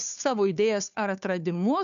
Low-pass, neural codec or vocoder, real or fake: 7.2 kHz; codec, 16 kHz, 2 kbps, FunCodec, trained on Chinese and English, 25 frames a second; fake